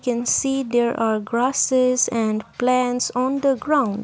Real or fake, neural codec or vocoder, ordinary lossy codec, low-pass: real; none; none; none